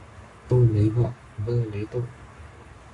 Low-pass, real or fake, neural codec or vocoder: 10.8 kHz; fake; codec, 44.1 kHz, 7.8 kbps, Pupu-Codec